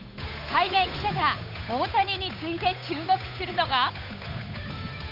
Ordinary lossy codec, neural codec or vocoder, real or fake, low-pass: none; codec, 16 kHz, 8 kbps, FunCodec, trained on Chinese and English, 25 frames a second; fake; 5.4 kHz